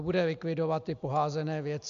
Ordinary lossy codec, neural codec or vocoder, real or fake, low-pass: MP3, 64 kbps; none; real; 7.2 kHz